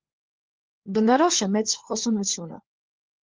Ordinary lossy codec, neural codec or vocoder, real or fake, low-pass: Opus, 16 kbps; codec, 16 kHz, 4 kbps, FunCodec, trained on LibriTTS, 50 frames a second; fake; 7.2 kHz